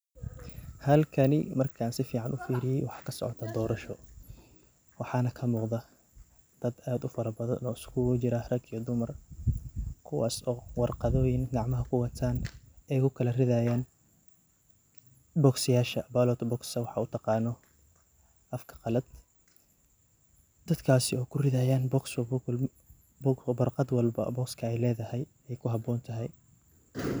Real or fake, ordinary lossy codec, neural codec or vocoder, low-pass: real; none; none; none